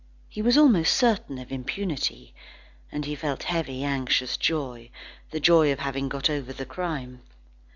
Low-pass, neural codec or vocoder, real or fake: 7.2 kHz; none; real